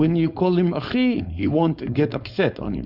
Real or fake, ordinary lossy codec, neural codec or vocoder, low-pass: fake; Opus, 64 kbps; codec, 16 kHz, 4.8 kbps, FACodec; 5.4 kHz